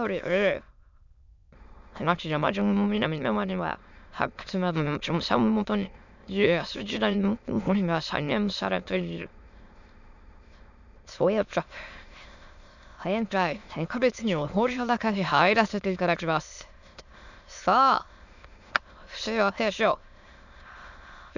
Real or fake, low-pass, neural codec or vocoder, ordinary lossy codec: fake; 7.2 kHz; autoencoder, 22.05 kHz, a latent of 192 numbers a frame, VITS, trained on many speakers; none